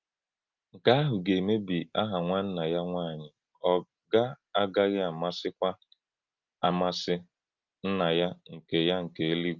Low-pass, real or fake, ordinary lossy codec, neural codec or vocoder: 7.2 kHz; real; Opus, 24 kbps; none